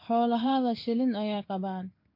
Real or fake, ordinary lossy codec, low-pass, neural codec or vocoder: fake; MP3, 32 kbps; 5.4 kHz; codec, 16 kHz in and 24 kHz out, 1 kbps, XY-Tokenizer